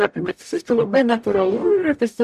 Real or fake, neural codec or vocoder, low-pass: fake; codec, 44.1 kHz, 0.9 kbps, DAC; 14.4 kHz